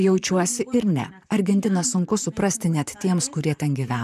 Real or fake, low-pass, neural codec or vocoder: fake; 14.4 kHz; vocoder, 44.1 kHz, 128 mel bands, Pupu-Vocoder